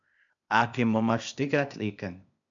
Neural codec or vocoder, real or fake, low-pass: codec, 16 kHz, 0.8 kbps, ZipCodec; fake; 7.2 kHz